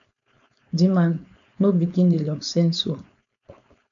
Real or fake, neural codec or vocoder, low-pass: fake; codec, 16 kHz, 4.8 kbps, FACodec; 7.2 kHz